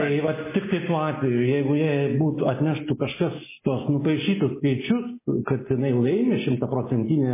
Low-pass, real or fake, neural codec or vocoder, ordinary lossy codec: 3.6 kHz; real; none; MP3, 16 kbps